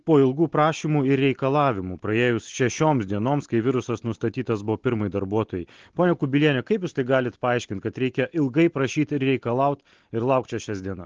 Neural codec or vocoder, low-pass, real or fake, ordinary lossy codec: none; 7.2 kHz; real; Opus, 16 kbps